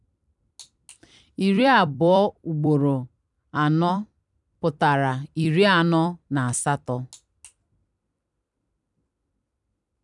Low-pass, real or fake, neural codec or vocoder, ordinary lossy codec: 10.8 kHz; fake; vocoder, 44.1 kHz, 128 mel bands every 256 samples, BigVGAN v2; none